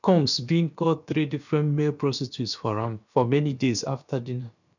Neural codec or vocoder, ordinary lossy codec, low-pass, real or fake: codec, 16 kHz, about 1 kbps, DyCAST, with the encoder's durations; none; 7.2 kHz; fake